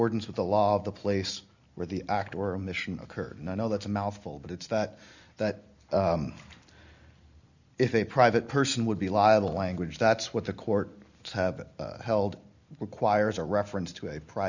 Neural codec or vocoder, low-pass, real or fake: none; 7.2 kHz; real